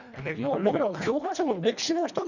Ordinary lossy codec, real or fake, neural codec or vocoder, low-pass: none; fake; codec, 24 kHz, 1.5 kbps, HILCodec; 7.2 kHz